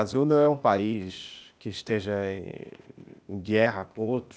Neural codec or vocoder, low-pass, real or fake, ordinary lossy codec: codec, 16 kHz, 0.8 kbps, ZipCodec; none; fake; none